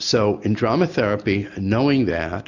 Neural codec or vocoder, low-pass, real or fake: none; 7.2 kHz; real